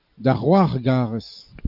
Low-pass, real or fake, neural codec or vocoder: 5.4 kHz; real; none